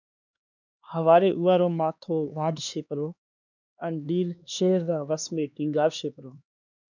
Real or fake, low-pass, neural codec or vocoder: fake; 7.2 kHz; codec, 16 kHz, 2 kbps, X-Codec, HuBERT features, trained on LibriSpeech